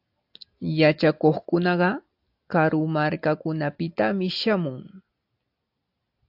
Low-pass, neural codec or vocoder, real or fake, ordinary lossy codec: 5.4 kHz; none; real; AAC, 48 kbps